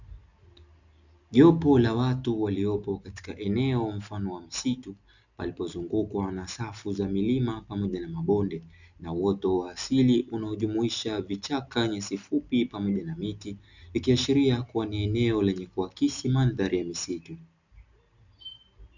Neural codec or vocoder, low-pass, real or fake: none; 7.2 kHz; real